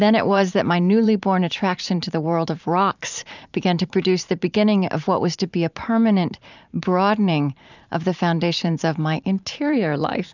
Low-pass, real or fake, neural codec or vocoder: 7.2 kHz; fake; vocoder, 44.1 kHz, 128 mel bands every 512 samples, BigVGAN v2